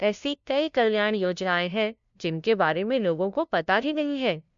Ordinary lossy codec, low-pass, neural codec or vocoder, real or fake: MP3, 64 kbps; 7.2 kHz; codec, 16 kHz, 0.5 kbps, FunCodec, trained on LibriTTS, 25 frames a second; fake